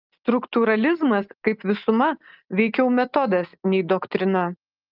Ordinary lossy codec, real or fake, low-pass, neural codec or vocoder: Opus, 32 kbps; real; 5.4 kHz; none